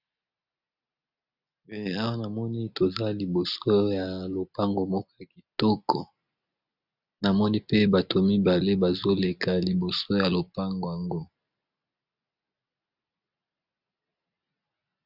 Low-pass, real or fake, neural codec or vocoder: 5.4 kHz; real; none